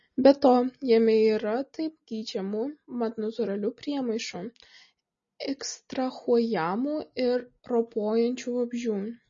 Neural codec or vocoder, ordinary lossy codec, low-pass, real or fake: none; MP3, 32 kbps; 7.2 kHz; real